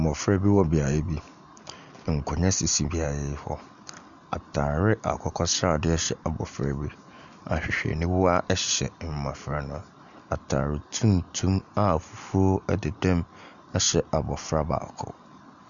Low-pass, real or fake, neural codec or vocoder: 7.2 kHz; real; none